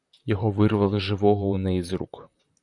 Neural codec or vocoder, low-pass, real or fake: vocoder, 44.1 kHz, 128 mel bands, Pupu-Vocoder; 10.8 kHz; fake